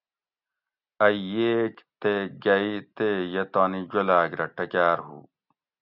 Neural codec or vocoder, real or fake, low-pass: none; real; 5.4 kHz